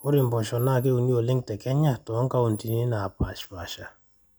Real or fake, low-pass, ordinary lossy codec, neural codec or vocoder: real; none; none; none